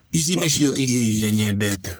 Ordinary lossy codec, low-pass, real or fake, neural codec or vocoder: none; none; fake; codec, 44.1 kHz, 1.7 kbps, Pupu-Codec